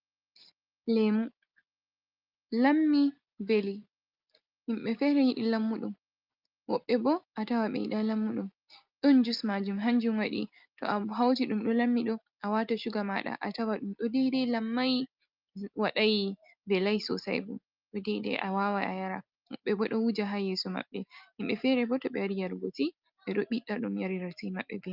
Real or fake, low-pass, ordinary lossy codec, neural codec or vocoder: real; 5.4 kHz; Opus, 24 kbps; none